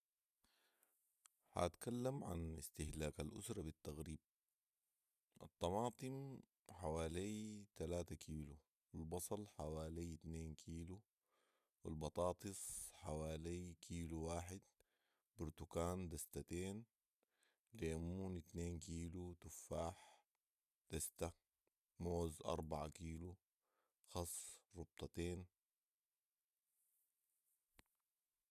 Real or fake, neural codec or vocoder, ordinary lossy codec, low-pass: real; none; none; 14.4 kHz